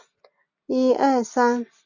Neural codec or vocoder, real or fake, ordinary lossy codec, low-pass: none; real; MP3, 64 kbps; 7.2 kHz